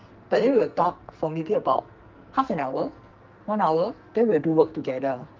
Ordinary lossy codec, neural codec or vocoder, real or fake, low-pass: Opus, 32 kbps; codec, 44.1 kHz, 2.6 kbps, SNAC; fake; 7.2 kHz